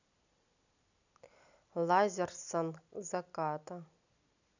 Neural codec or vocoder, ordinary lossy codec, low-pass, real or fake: none; none; 7.2 kHz; real